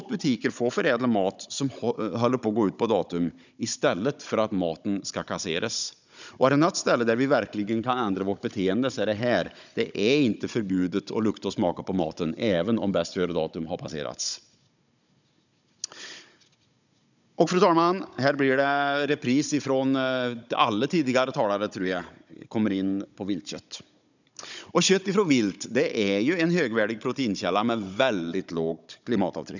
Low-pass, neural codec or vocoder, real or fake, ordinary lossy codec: 7.2 kHz; codec, 16 kHz, 16 kbps, FunCodec, trained on Chinese and English, 50 frames a second; fake; none